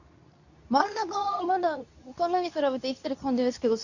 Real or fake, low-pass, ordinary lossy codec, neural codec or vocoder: fake; 7.2 kHz; none; codec, 24 kHz, 0.9 kbps, WavTokenizer, medium speech release version 2